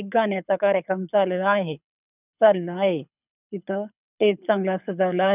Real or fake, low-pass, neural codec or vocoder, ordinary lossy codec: fake; 3.6 kHz; codec, 24 kHz, 6 kbps, HILCodec; none